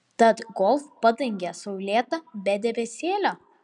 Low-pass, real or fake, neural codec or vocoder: 10.8 kHz; real; none